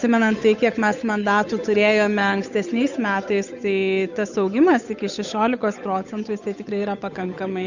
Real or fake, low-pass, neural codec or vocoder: fake; 7.2 kHz; codec, 16 kHz, 8 kbps, FunCodec, trained on Chinese and English, 25 frames a second